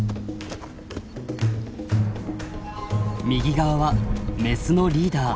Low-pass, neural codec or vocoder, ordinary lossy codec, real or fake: none; none; none; real